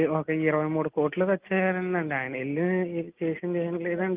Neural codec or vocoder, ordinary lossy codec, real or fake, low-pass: none; Opus, 32 kbps; real; 3.6 kHz